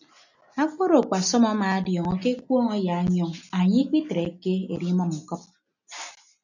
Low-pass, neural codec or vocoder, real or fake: 7.2 kHz; none; real